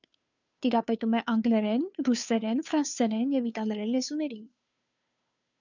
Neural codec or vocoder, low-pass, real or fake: codec, 16 kHz, 2 kbps, FunCodec, trained on Chinese and English, 25 frames a second; 7.2 kHz; fake